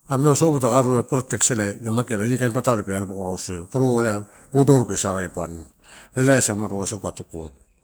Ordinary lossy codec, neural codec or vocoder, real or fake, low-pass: none; codec, 44.1 kHz, 2.6 kbps, SNAC; fake; none